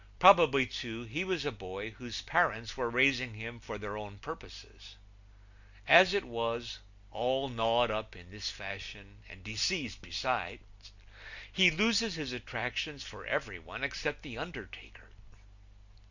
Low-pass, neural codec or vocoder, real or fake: 7.2 kHz; none; real